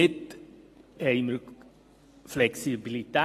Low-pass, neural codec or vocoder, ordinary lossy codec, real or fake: 14.4 kHz; none; AAC, 48 kbps; real